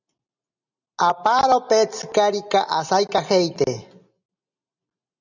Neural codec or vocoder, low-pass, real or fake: none; 7.2 kHz; real